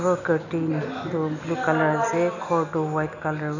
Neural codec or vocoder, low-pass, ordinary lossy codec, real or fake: none; 7.2 kHz; none; real